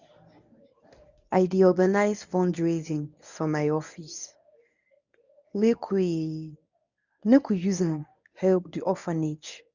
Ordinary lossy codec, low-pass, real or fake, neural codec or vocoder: none; 7.2 kHz; fake; codec, 24 kHz, 0.9 kbps, WavTokenizer, medium speech release version 1